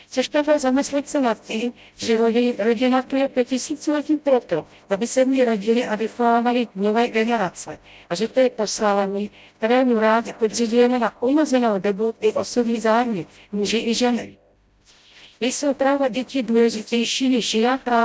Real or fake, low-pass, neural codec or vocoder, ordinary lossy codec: fake; none; codec, 16 kHz, 0.5 kbps, FreqCodec, smaller model; none